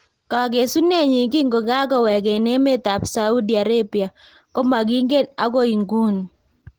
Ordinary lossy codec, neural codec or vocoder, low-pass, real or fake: Opus, 16 kbps; none; 19.8 kHz; real